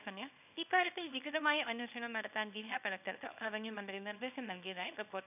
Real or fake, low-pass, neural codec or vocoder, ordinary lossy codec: fake; 3.6 kHz; codec, 24 kHz, 0.9 kbps, WavTokenizer, small release; none